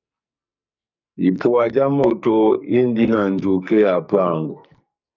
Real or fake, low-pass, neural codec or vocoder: fake; 7.2 kHz; codec, 44.1 kHz, 2.6 kbps, SNAC